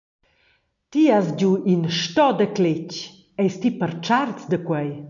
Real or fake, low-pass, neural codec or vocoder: real; 7.2 kHz; none